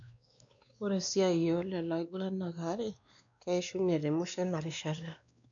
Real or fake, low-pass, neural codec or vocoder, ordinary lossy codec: fake; 7.2 kHz; codec, 16 kHz, 2 kbps, X-Codec, WavLM features, trained on Multilingual LibriSpeech; none